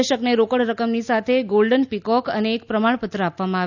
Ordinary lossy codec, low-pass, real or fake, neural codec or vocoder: none; 7.2 kHz; real; none